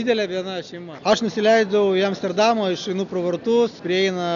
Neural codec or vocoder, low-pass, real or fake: none; 7.2 kHz; real